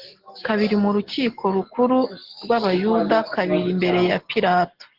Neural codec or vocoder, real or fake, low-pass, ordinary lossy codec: none; real; 5.4 kHz; Opus, 16 kbps